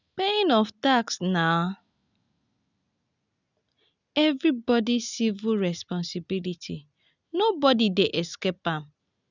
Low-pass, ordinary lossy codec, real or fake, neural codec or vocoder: 7.2 kHz; none; real; none